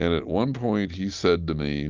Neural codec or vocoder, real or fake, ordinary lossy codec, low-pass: none; real; Opus, 24 kbps; 7.2 kHz